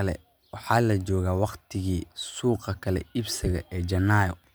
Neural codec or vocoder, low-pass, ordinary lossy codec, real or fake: none; none; none; real